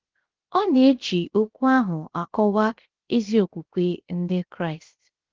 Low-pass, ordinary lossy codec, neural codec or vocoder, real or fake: 7.2 kHz; Opus, 16 kbps; codec, 16 kHz, 0.7 kbps, FocalCodec; fake